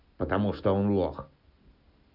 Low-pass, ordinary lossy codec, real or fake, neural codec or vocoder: 5.4 kHz; none; real; none